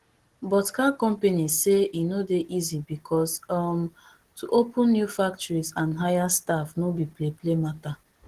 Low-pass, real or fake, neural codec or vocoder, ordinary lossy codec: 14.4 kHz; real; none; Opus, 16 kbps